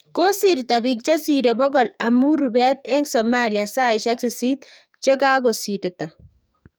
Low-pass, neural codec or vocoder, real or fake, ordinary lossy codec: none; codec, 44.1 kHz, 2.6 kbps, SNAC; fake; none